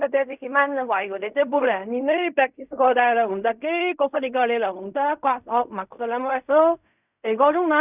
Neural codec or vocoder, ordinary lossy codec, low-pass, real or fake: codec, 16 kHz in and 24 kHz out, 0.4 kbps, LongCat-Audio-Codec, fine tuned four codebook decoder; none; 3.6 kHz; fake